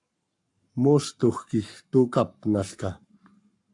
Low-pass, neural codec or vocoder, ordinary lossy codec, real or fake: 10.8 kHz; codec, 44.1 kHz, 7.8 kbps, Pupu-Codec; AAC, 64 kbps; fake